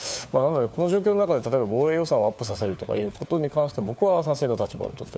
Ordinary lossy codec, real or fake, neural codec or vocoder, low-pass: none; fake; codec, 16 kHz, 4 kbps, FunCodec, trained on LibriTTS, 50 frames a second; none